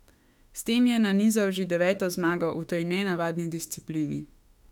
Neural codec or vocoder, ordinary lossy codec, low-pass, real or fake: autoencoder, 48 kHz, 32 numbers a frame, DAC-VAE, trained on Japanese speech; none; 19.8 kHz; fake